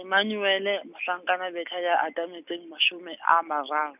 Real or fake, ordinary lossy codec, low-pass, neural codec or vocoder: real; none; 3.6 kHz; none